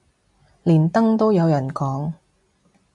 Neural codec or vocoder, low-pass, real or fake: none; 10.8 kHz; real